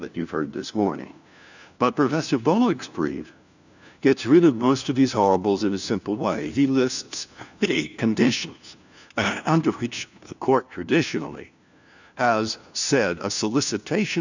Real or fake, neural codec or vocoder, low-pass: fake; codec, 16 kHz, 1 kbps, FunCodec, trained on LibriTTS, 50 frames a second; 7.2 kHz